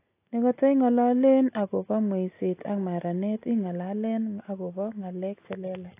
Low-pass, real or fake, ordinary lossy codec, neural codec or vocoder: 3.6 kHz; real; AAC, 24 kbps; none